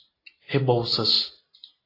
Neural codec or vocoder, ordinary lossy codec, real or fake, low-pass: none; AAC, 24 kbps; real; 5.4 kHz